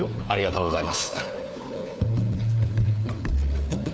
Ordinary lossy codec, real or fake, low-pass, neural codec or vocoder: none; fake; none; codec, 16 kHz, 4 kbps, FunCodec, trained on LibriTTS, 50 frames a second